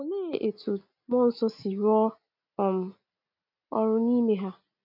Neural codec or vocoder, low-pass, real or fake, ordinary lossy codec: none; 5.4 kHz; real; none